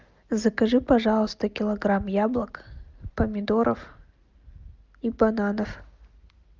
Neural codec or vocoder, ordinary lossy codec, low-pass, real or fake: none; Opus, 24 kbps; 7.2 kHz; real